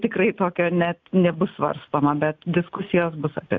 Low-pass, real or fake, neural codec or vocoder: 7.2 kHz; real; none